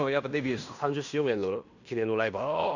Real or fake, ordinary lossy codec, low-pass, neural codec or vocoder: fake; none; 7.2 kHz; codec, 16 kHz in and 24 kHz out, 0.9 kbps, LongCat-Audio-Codec, fine tuned four codebook decoder